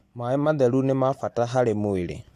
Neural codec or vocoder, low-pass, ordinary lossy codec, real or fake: none; 14.4 kHz; AAC, 64 kbps; real